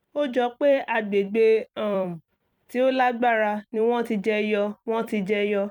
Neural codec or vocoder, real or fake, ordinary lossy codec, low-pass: vocoder, 44.1 kHz, 128 mel bands every 256 samples, BigVGAN v2; fake; none; 19.8 kHz